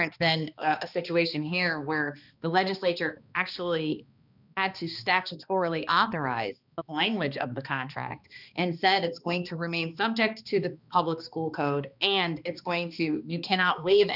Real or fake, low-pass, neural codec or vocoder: fake; 5.4 kHz; codec, 16 kHz, 2 kbps, X-Codec, HuBERT features, trained on balanced general audio